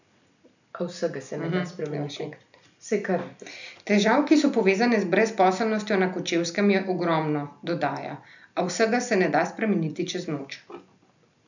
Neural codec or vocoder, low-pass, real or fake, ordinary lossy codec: none; 7.2 kHz; real; none